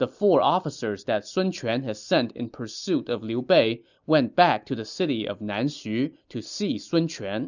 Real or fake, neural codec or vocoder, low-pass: real; none; 7.2 kHz